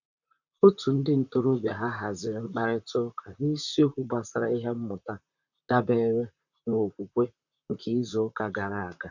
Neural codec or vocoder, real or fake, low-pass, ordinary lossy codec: vocoder, 44.1 kHz, 128 mel bands, Pupu-Vocoder; fake; 7.2 kHz; none